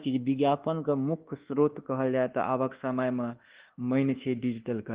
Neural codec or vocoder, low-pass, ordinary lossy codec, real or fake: codec, 24 kHz, 1.2 kbps, DualCodec; 3.6 kHz; Opus, 16 kbps; fake